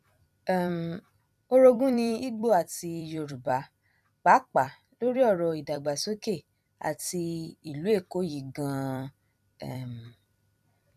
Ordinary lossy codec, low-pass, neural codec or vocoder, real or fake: none; 14.4 kHz; vocoder, 44.1 kHz, 128 mel bands every 256 samples, BigVGAN v2; fake